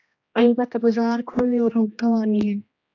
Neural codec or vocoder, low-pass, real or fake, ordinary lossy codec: codec, 16 kHz, 1 kbps, X-Codec, HuBERT features, trained on general audio; 7.2 kHz; fake; AAC, 48 kbps